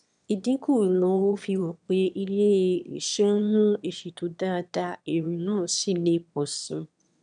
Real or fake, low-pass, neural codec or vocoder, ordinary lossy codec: fake; 9.9 kHz; autoencoder, 22.05 kHz, a latent of 192 numbers a frame, VITS, trained on one speaker; none